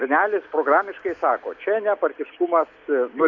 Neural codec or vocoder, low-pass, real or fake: none; 7.2 kHz; real